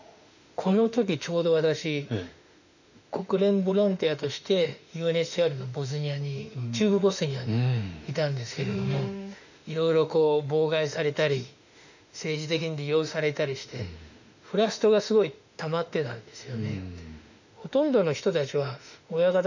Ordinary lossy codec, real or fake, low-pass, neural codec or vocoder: none; fake; 7.2 kHz; autoencoder, 48 kHz, 32 numbers a frame, DAC-VAE, trained on Japanese speech